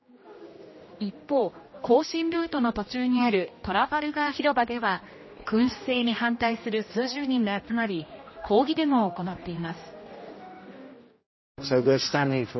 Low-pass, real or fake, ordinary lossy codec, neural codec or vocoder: 7.2 kHz; fake; MP3, 24 kbps; codec, 16 kHz, 1 kbps, X-Codec, HuBERT features, trained on general audio